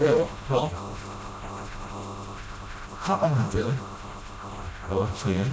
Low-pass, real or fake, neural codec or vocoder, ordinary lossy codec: none; fake; codec, 16 kHz, 0.5 kbps, FreqCodec, smaller model; none